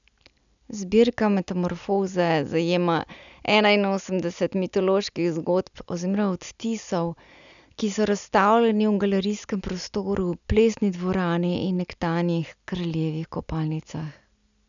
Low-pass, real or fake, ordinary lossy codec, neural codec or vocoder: 7.2 kHz; real; none; none